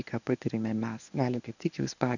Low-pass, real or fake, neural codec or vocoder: 7.2 kHz; fake; codec, 24 kHz, 0.9 kbps, WavTokenizer, medium speech release version 1